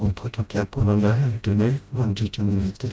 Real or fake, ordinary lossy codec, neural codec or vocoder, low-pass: fake; none; codec, 16 kHz, 0.5 kbps, FreqCodec, smaller model; none